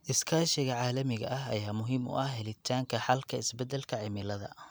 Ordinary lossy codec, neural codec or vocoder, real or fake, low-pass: none; none; real; none